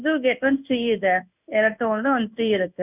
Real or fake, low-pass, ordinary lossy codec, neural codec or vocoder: fake; 3.6 kHz; none; codec, 16 kHz in and 24 kHz out, 1 kbps, XY-Tokenizer